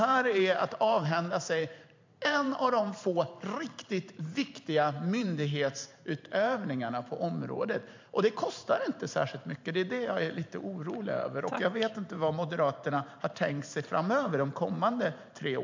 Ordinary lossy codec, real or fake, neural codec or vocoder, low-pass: MP3, 64 kbps; fake; vocoder, 44.1 kHz, 128 mel bands every 512 samples, BigVGAN v2; 7.2 kHz